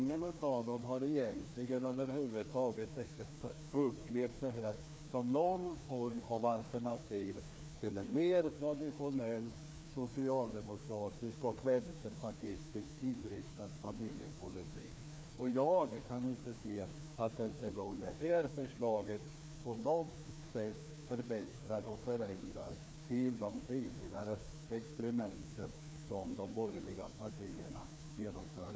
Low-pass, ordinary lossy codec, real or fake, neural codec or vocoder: none; none; fake; codec, 16 kHz, 2 kbps, FreqCodec, larger model